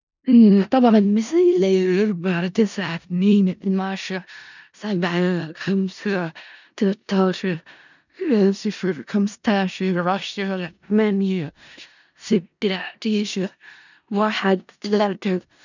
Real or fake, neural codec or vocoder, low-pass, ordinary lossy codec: fake; codec, 16 kHz in and 24 kHz out, 0.4 kbps, LongCat-Audio-Codec, four codebook decoder; 7.2 kHz; none